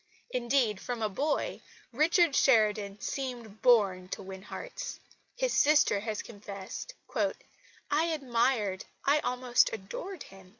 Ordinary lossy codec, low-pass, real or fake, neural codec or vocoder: Opus, 64 kbps; 7.2 kHz; real; none